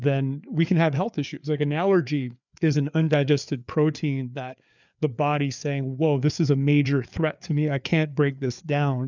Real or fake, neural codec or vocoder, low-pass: fake; codec, 16 kHz, 4 kbps, FreqCodec, larger model; 7.2 kHz